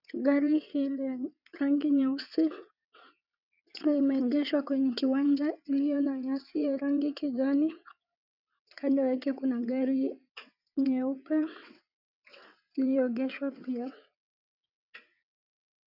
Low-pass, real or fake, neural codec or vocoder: 5.4 kHz; fake; vocoder, 22.05 kHz, 80 mel bands, WaveNeXt